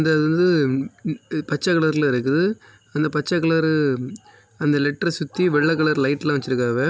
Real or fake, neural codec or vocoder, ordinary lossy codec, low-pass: real; none; none; none